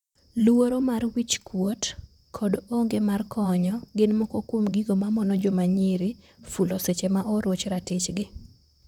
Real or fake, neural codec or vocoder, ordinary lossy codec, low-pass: fake; vocoder, 44.1 kHz, 128 mel bands, Pupu-Vocoder; none; 19.8 kHz